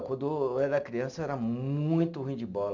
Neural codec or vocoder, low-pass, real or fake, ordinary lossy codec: none; 7.2 kHz; real; none